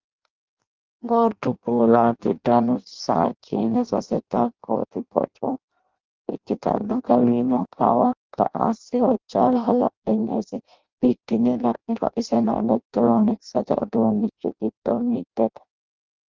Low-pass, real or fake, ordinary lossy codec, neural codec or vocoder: 7.2 kHz; fake; Opus, 24 kbps; codec, 16 kHz in and 24 kHz out, 0.6 kbps, FireRedTTS-2 codec